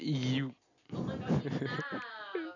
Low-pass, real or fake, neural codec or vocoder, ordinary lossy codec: 7.2 kHz; real; none; none